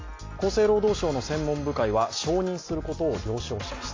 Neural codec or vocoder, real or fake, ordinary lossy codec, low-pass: none; real; AAC, 32 kbps; 7.2 kHz